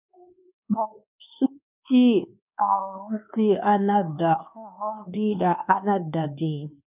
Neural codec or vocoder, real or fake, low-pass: codec, 24 kHz, 1.2 kbps, DualCodec; fake; 3.6 kHz